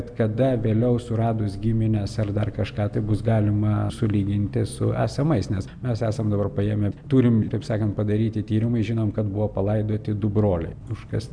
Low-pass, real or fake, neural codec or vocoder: 9.9 kHz; real; none